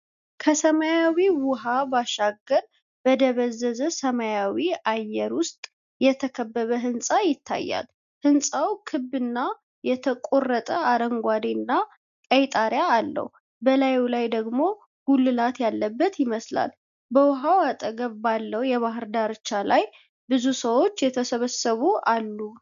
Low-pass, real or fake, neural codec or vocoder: 7.2 kHz; real; none